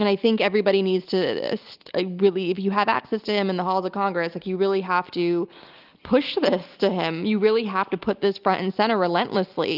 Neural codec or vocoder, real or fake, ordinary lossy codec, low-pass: none; real; Opus, 32 kbps; 5.4 kHz